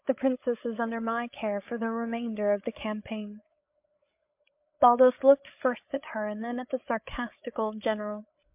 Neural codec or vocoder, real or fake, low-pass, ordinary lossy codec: codec, 16 kHz, 8 kbps, FreqCodec, larger model; fake; 3.6 kHz; MP3, 32 kbps